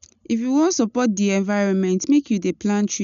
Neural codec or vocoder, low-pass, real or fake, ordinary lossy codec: none; 7.2 kHz; real; none